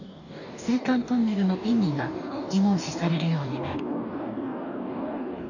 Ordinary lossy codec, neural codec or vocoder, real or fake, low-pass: none; codec, 44.1 kHz, 2.6 kbps, DAC; fake; 7.2 kHz